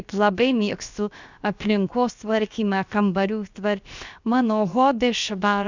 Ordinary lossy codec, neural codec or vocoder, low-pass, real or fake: Opus, 64 kbps; codec, 16 kHz, about 1 kbps, DyCAST, with the encoder's durations; 7.2 kHz; fake